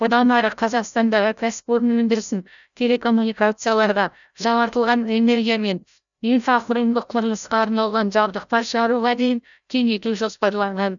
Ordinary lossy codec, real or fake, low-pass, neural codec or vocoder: none; fake; 7.2 kHz; codec, 16 kHz, 0.5 kbps, FreqCodec, larger model